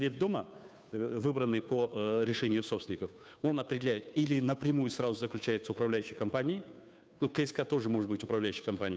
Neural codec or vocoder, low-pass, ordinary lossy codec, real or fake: codec, 16 kHz, 2 kbps, FunCodec, trained on Chinese and English, 25 frames a second; none; none; fake